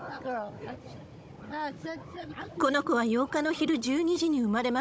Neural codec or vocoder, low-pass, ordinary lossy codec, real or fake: codec, 16 kHz, 16 kbps, FunCodec, trained on Chinese and English, 50 frames a second; none; none; fake